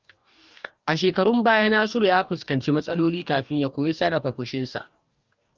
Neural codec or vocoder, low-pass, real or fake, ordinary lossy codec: codec, 44.1 kHz, 2.6 kbps, DAC; 7.2 kHz; fake; Opus, 24 kbps